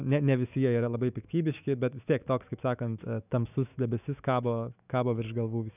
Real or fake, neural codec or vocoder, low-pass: fake; autoencoder, 48 kHz, 128 numbers a frame, DAC-VAE, trained on Japanese speech; 3.6 kHz